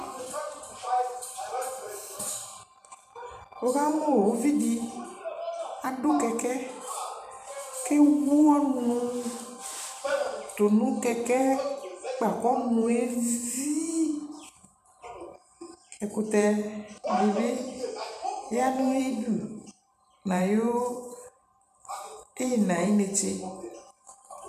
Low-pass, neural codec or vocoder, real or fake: 14.4 kHz; vocoder, 44.1 kHz, 128 mel bands every 512 samples, BigVGAN v2; fake